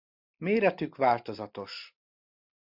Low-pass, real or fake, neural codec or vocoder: 5.4 kHz; real; none